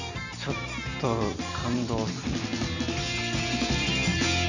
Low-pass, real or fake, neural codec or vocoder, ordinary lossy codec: 7.2 kHz; real; none; none